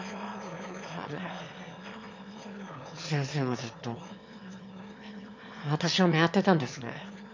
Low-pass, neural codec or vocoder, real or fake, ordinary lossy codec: 7.2 kHz; autoencoder, 22.05 kHz, a latent of 192 numbers a frame, VITS, trained on one speaker; fake; MP3, 48 kbps